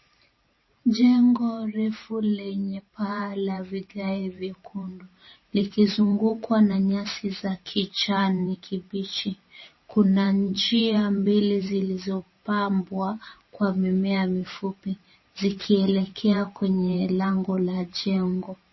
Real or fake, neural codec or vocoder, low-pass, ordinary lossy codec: fake; vocoder, 44.1 kHz, 128 mel bands every 512 samples, BigVGAN v2; 7.2 kHz; MP3, 24 kbps